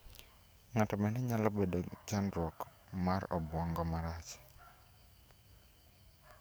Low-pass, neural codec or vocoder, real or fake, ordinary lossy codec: none; codec, 44.1 kHz, 7.8 kbps, DAC; fake; none